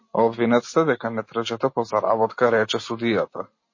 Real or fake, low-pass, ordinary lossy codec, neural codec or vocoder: real; 7.2 kHz; MP3, 32 kbps; none